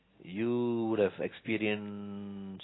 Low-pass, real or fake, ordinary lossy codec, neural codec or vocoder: 7.2 kHz; real; AAC, 16 kbps; none